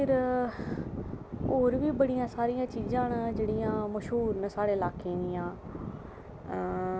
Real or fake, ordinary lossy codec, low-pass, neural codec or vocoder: real; none; none; none